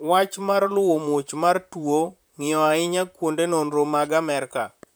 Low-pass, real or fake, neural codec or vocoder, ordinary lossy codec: none; fake; vocoder, 44.1 kHz, 128 mel bands, Pupu-Vocoder; none